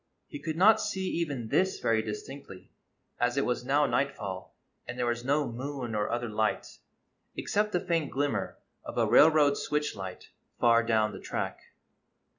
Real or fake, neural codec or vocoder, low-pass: real; none; 7.2 kHz